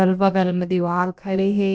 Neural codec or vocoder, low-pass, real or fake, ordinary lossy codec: codec, 16 kHz, about 1 kbps, DyCAST, with the encoder's durations; none; fake; none